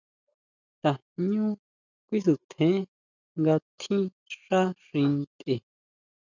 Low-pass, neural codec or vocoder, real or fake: 7.2 kHz; none; real